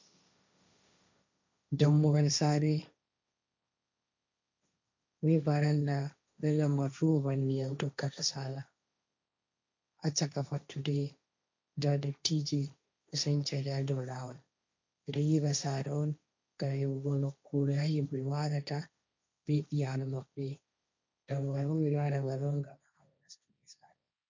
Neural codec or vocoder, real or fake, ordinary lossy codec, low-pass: codec, 16 kHz, 1.1 kbps, Voila-Tokenizer; fake; MP3, 64 kbps; 7.2 kHz